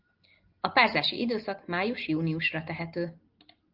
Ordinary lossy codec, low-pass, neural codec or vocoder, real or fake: Opus, 24 kbps; 5.4 kHz; none; real